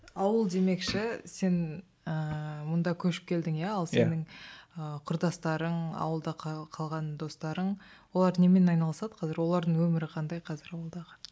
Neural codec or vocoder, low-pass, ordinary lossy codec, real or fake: none; none; none; real